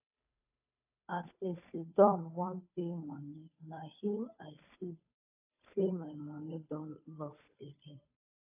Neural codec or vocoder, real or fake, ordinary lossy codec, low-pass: codec, 16 kHz, 2 kbps, FunCodec, trained on Chinese and English, 25 frames a second; fake; AAC, 24 kbps; 3.6 kHz